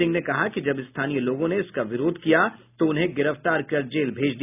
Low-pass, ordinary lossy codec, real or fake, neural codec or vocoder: 3.6 kHz; none; real; none